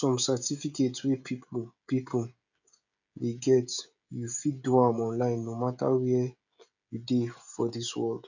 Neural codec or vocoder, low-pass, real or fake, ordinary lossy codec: codec, 16 kHz, 16 kbps, FreqCodec, smaller model; 7.2 kHz; fake; none